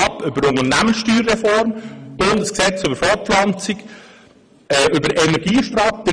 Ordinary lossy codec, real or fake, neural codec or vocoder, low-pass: none; fake; vocoder, 44.1 kHz, 128 mel bands every 512 samples, BigVGAN v2; 9.9 kHz